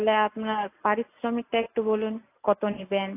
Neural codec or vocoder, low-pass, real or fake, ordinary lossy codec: none; 3.6 kHz; real; AAC, 24 kbps